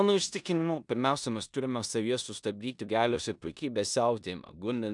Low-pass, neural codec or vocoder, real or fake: 10.8 kHz; codec, 16 kHz in and 24 kHz out, 0.9 kbps, LongCat-Audio-Codec, four codebook decoder; fake